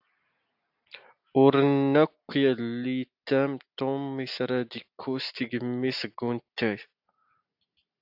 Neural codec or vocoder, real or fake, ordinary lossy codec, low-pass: none; real; AAC, 48 kbps; 5.4 kHz